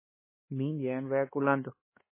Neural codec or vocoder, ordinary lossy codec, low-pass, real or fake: codec, 16 kHz, 2 kbps, X-Codec, HuBERT features, trained on LibriSpeech; MP3, 16 kbps; 3.6 kHz; fake